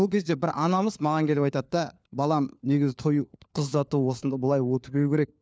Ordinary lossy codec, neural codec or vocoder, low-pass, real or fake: none; codec, 16 kHz, 4 kbps, FunCodec, trained on LibriTTS, 50 frames a second; none; fake